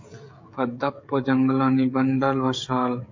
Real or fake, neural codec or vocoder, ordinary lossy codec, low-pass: fake; codec, 16 kHz, 16 kbps, FreqCodec, smaller model; MP3, 48 kbps; 7.2 kHz